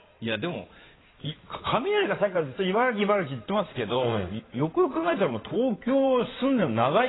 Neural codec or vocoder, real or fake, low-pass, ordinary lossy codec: codec, 16 kHz in and 24 kHz out, 2.2 kbps, FireRedTTS-2 codec; fake; 7.2 kHz; AAC, 16 kbps